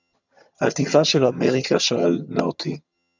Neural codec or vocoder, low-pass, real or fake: vocoder, 22.05 kHz, 80 mel bands, HiFi-GAN; 7.2 kHz; fake